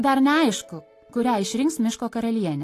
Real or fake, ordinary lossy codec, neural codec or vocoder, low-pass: real; AAC, 48 kbps; none; 14.4 kHz